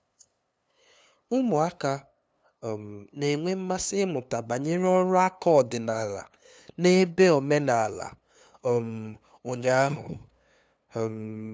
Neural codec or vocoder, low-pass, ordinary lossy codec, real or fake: codec, 16 kHz, 2 kbps, FunCodec, trained on LibriTTS, 25 frames a second; none; none; fake